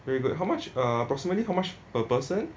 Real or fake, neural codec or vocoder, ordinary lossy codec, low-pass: real; none; none; none